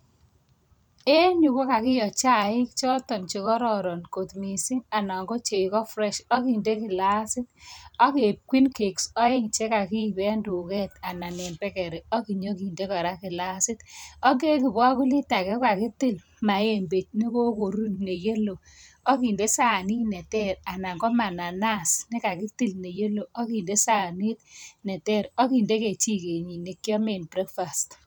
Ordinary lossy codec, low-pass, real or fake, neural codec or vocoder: none; none; fake; vocoder, 44.1 kHz, 128 mel bands every 512 samples, BigVGAN v2